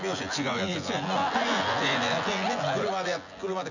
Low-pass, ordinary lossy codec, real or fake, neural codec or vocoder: 7.2 kHz; none; fake; vocoder, 24 kHz, 100 mel bands, Vocos